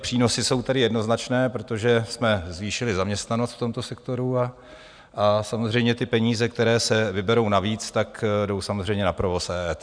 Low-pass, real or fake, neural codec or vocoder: 9.9 kHz; real; none